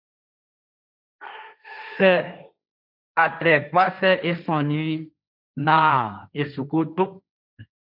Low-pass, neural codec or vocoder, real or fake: 5.4 kHz; codec, 16 kHz, 1.1 kbps, Voila-Tokenizer; fake